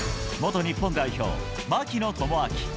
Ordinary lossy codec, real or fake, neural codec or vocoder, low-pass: none; real; none; none